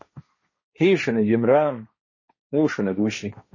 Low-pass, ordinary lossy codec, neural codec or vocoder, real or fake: 7.2 kHz; MP3, 32 kbps; codec, 16 kHz, 1.1 kbps, Voila-Tokenizer; fake